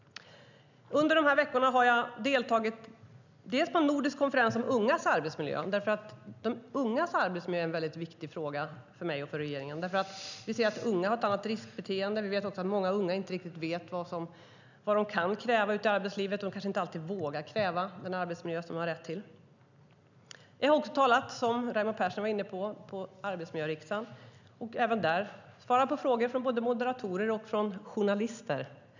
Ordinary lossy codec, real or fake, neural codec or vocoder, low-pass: none; real; none; 7.2 kHz